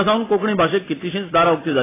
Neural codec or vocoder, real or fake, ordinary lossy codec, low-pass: none; real; AAC, 16 kbps; 3.6 kHz